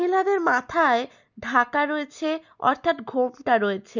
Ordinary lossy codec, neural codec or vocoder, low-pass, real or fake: none; none; 7.2 kHz; real